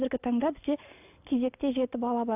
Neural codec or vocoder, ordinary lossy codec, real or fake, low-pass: none; AAC, 32 kbps; real; 3.6 kHz